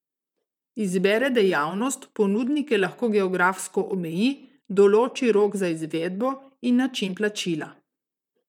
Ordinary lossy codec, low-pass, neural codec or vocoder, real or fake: none; 19.8 kHz; vocoder, 44.1 kHz, 128 mel bands, Pupu-Vocoder; fake